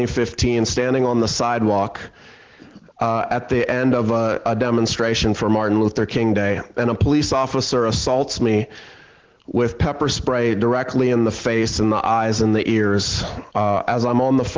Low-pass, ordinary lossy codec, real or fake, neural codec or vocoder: 7.2 kHz; Opus, 16 kbps; real; none